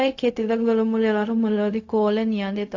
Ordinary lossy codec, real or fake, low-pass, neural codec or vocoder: AAC, 48 kbps; fake; 7.2 kHz; codec, 16 kHz, 0.4 kbps, LongCat-Audio-Codec